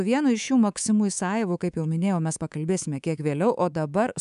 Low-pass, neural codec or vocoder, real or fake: 10.8 kHz; codec, 24 kHz, 3.1 kbps, DualCodec; fake